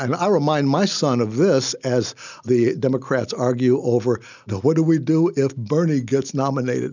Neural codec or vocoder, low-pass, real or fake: none; 7.2 kHz; real